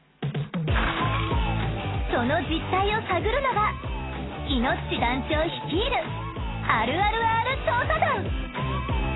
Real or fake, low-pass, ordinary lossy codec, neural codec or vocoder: real; 7.2 kHz; AAC, 16 kbps; none